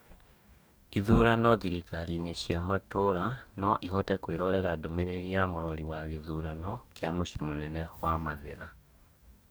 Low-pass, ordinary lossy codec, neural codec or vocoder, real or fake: none; none; codec, 44.1 kHz, 2.6 kbps, DAC; fake